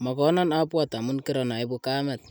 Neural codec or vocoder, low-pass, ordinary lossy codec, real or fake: none; none; none; real